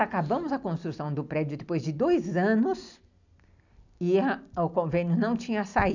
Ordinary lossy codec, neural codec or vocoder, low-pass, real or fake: none; none; 7.2 kHz; real